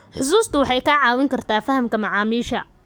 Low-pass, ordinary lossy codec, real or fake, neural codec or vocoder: none; none; fake; codec, 44.1 kHz, 7.8 kbps, DAC